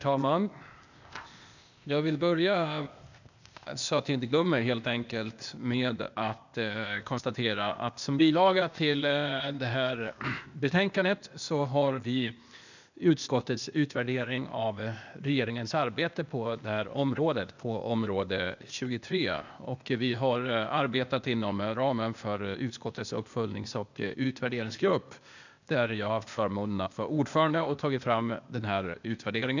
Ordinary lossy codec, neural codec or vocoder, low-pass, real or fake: none; codec, 16 kHz, 0.8 kbps, ZipCodec; 7.2 kHz; fake